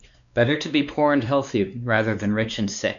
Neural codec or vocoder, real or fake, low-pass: codec, 16 kHz, 2 kbps, X-Codec, WavLM features, trained on Multilingual LibriSpeech; fake; 7.2 kHz